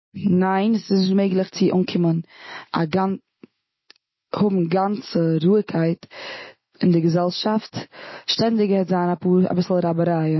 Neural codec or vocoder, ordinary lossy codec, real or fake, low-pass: none; MP3, 24 kbps; real; 7.2 kHz